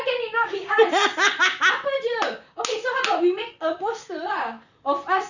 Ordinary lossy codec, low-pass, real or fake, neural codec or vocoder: none; 7.2 kHz; fake; vocoder, 44.1 kHz, 128 mel bands, Pupu-Vocoder